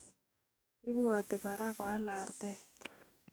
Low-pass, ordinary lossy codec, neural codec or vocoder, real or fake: none; none; codec, 44.1 kHz, 2.6 kbps, DAC; fake